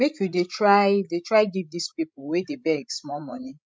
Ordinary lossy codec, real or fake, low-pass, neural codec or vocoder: none; fake; none; codec, 16 kHz, 16 kbps, FreqCodec, larger model